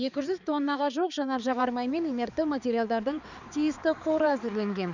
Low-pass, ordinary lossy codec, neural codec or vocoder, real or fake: 7.2 kHz; none; codec, 16 kHz, 4 kbps, X-Codec, HuBERT features, trained on balanced general audio; fake